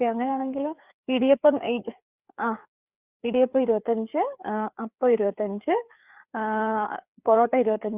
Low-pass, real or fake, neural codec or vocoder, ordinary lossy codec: 3.6 kHz; fake; codec, 16 kHz, 4 kbps, FreqCodec, larger model; Opus, 64 kbps